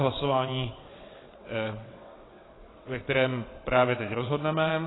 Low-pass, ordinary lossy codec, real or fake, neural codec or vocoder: 7.2 kHz; AAC, 16 kbps; fake; vocoder, 22.05 kHz, 80 mel bands, WaveNeXt